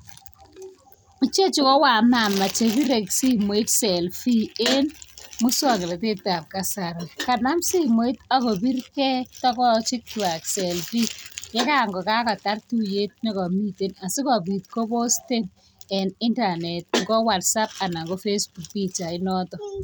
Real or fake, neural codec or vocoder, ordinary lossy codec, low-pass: real; none; none; none